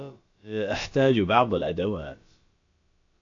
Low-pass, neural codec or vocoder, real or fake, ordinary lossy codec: 7.2 kHz; codec, 16 kHz, about 1 kbps, DyCAST, with the encoder's durations; fake; AAC, 48 kbps